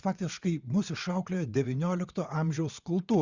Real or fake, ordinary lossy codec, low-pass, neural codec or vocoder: fake; Opus, 64 kbps; 7.2 kHz; vocoder, 22.05 kHz, 80 mel bands, WaveNeXt